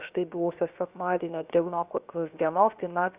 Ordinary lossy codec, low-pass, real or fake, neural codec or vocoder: Opus, 64 kbps; 3.6 kHz; fake; codec, 16 kHz, 0.7 kbps, FocalCodec